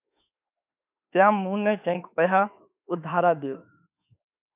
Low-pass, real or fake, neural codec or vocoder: 3.6 kHz; fake; autoencoder, 48 kHz, 32 numbers a frame, DAC-VAE, trained on Japanese speech